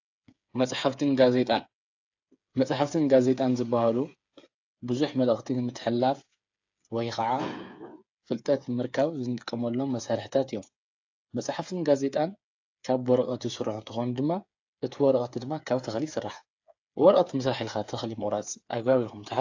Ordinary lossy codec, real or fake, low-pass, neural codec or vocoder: AAC, 48 kbps; fake; 7.2 kHz; codec, 16 kHz, 8 kbps, FreqCodec, smaller model